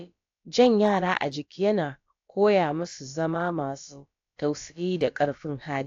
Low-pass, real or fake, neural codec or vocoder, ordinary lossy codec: 7.2 kHz; fake; codec, 16 kHz, about 1 kbps, DyCAST, with the encoder's durations; AAC, 48 kbps